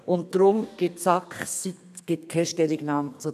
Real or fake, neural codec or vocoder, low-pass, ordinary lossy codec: fake; codec, 32 kHz, 1.9 kbps, SNAC; 14.4 kHz; none